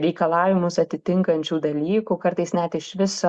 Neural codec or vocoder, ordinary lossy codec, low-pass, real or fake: none; Opus, 64 kbps; 9.9 kHz; real